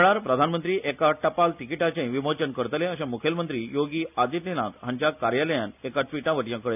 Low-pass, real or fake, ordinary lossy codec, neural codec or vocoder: 3.6 kHz; real; none; none